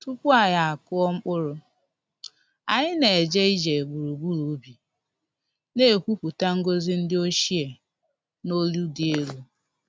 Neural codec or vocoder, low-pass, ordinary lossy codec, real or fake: none; none; none; real